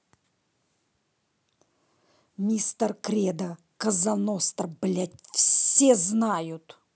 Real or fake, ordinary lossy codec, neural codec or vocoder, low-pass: real; none; none; none